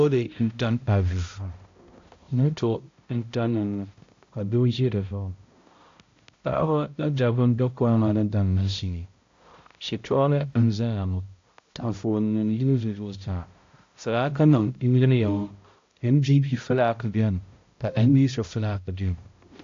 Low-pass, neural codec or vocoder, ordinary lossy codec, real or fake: 7.2 kHz; codec, 16 kHz, 0.5 kbps, X-Codec, HuBERT features, trained on balanced general audio; AAC, 48 kbps; fake